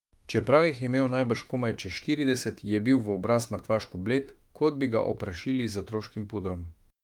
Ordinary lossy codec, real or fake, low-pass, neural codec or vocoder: Opus, 32 kbps; fake; 19.8 kHz; autoencoder, 48 kHz, 32 numbers a frame, DAC-VAE, trained on Japanese speech